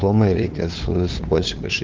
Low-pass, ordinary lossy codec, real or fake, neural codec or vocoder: 7.2 kHz; Opus, 16 kbps; fake; codec, 16 kHz, 2 kbps, FunCodec, trained on LibriTTS, 25 frames a second